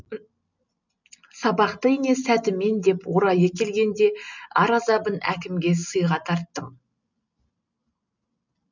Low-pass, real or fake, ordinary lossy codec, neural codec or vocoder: 7.2 kHz; real; none; none